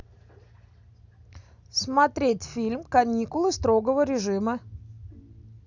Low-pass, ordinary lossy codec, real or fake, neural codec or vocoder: 7.2 kHz; none; real; none